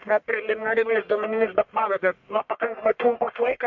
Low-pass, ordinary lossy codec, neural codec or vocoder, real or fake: 7.2 kHz; MP3, 48 kbps; codec, 44.1 kHz, 1.7 kbps, Pupu-Codec; fake